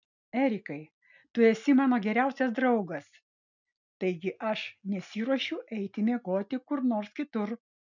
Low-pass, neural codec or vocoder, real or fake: 7.2 kHz; none; real